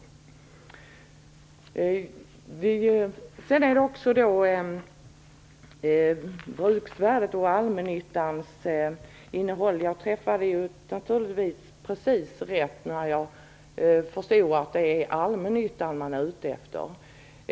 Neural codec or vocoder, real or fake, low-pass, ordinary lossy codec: none; real; none; none